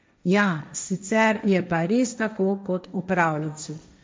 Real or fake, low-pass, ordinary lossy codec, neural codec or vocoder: fake; none; none; codec, 16 kHz, 1.1 kbps, Voila-Tokenizer